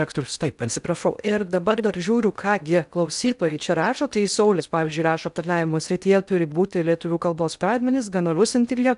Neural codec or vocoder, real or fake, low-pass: codec, 16 kHz in and 24 kHz out, 0.6 kbps, FocalCodec, streaming, 2048 codes; fake; 10.8 kHz